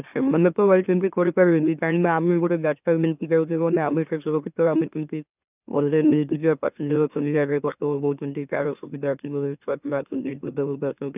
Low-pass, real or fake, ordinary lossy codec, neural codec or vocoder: 3.6 kHz; fake; none; autoencoder, 44.1 kHz, a latent of 192 numbers a frame, MeloTTS